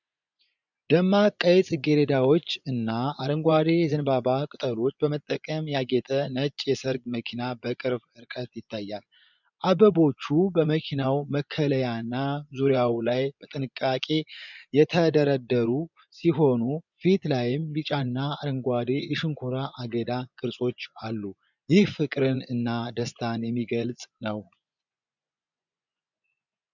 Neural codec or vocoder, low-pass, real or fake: vocoder, 24 kHz, 100 mel bands, Vocos; 7.2 kHz; fake